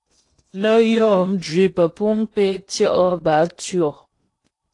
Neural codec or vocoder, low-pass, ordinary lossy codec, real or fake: codec, 16 kHz in and 24 kHz out, 0.8 kbps, FocalCodec, streaming, 65536 codes; 10.8 kHz; AAC, 48 kbps; fake